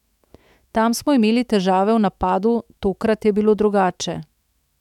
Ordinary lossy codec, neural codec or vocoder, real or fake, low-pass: none; autoencoder, 48 kHz, 128 numbers a frame, DAC-VAE, trained on Japanese speech; fake; 19.8 kHz